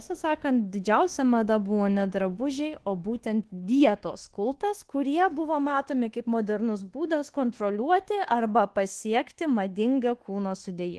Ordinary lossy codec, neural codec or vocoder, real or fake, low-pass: Opus, 16 kbps; codec, 24 kHz, 1.2 kbps, DualCodec; fake; 10.8 kHz